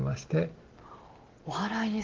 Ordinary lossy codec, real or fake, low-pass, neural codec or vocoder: Opus, 16 kbps; real; 7.2 kHz; none